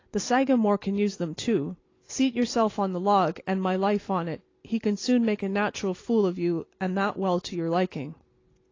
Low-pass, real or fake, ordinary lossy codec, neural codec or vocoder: 7.2 kHz; real; AAC, 32 kbps; none